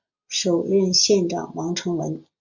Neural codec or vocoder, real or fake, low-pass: none; real; 7.2 kHz